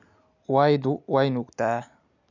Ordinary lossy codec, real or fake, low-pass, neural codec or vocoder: none; real; 7.2 kHz; none